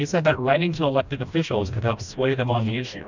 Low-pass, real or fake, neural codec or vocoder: 7.2 kHz; fake; codec, 16 kHz, 1 kbps, FreqCodec, smaller model